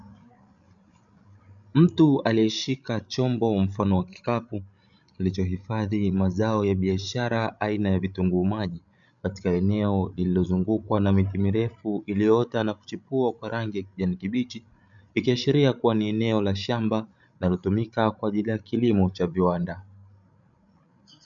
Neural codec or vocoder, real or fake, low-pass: codec, 16 kHz, 16 kbps, FreqCodec, larger model; fake; 7.2 kHz